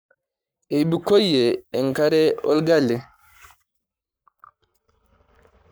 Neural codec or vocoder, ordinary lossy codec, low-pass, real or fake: codec, 44.1 kHz, 7.8 kbps, Pupu-Codec; none; none; fake